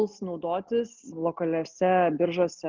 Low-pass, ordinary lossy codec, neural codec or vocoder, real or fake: 7.2 kHz; Opus, 24 kbps; none; real